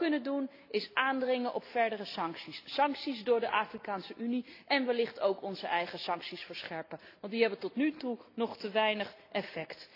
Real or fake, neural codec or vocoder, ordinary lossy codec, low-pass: real; none; AAC, 32 kbps; 5.4 kHz